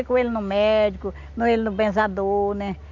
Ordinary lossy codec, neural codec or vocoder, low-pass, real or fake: none; none; 7.2 kHz; real